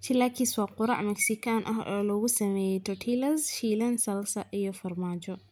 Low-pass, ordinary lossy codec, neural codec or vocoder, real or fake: none; none; none; real